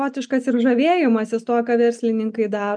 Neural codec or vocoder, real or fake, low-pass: none; real; 9.9 kHz